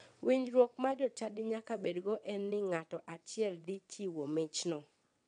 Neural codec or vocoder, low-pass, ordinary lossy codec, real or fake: vocoder, 22.05 kHz, 80 mel bands, WaveNeXt; 9.9 kHz; none; fake